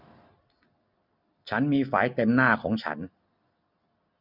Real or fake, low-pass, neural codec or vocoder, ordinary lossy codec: real; 5.4 kHz; none; none